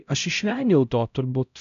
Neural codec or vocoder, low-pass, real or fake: codec, 16 kHz, 0.5 kbps, X-Codec, HuBERT features, trained on LibriSpeech; 7.2 kHz; fake